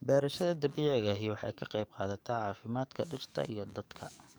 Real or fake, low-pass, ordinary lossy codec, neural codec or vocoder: fake; none; none; codec, 44.1 kHz, 7.8 kbps, DAC